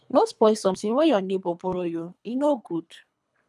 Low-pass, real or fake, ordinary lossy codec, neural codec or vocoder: none; fake; none; codec, 24 kHz, 3 kbps, HILCodec